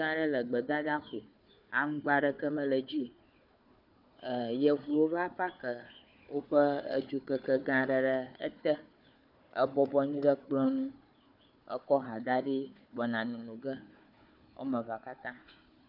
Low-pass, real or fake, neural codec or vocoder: 5.4 kHz; fake; codec, 16 kHz, 4 kbps, FunCodec, trained on Chinese and English, 50 frames a second